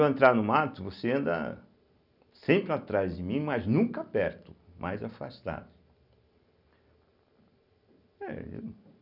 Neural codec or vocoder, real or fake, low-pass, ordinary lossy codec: none; real; 5.4 kHz; none